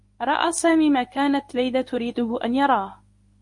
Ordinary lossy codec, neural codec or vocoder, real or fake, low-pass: MP3, 48 kbps; codec, 24 kHz, 0.9 kbps, WavTokenizer, medium speech release version 1; fake; 10.8 kHz